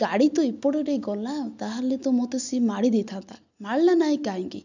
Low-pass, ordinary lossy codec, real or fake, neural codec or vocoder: 7.2 kHz; none; real; none